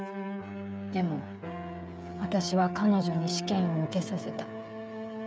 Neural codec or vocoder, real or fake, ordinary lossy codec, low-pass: codec, 16 kHz, 16 kbps, FreqCodec, smaller model; fake; none; none